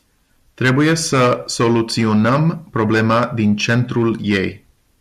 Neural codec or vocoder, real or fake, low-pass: none; real; 14.4 kHz